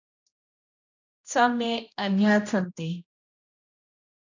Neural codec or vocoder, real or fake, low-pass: codec, 16 kHz, 1 kbps, X-Codec, HuBERT features, trained on general audio; fake; 7.2 kHz